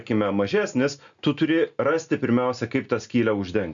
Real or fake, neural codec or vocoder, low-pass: real; none; 7.2 kHz